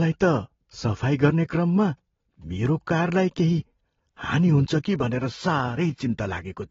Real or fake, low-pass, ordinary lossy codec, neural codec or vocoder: real; 7.2 kHz; AAC, 24 kbps; none